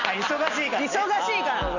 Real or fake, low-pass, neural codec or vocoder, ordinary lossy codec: real; 7.2 kHz; none; none